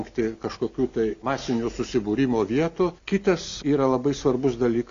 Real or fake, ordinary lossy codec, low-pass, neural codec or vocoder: real; MP3, 64 kbps; 7.2 kHz; none